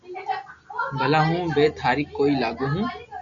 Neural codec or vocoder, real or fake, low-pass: none; real; 7.2 kHz